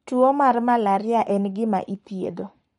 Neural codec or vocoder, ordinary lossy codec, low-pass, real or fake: codec, 44.1 kHz, 7.8 kbps, Pupu-Codec; MP3, 48 kbps; 19.8 kHz; fake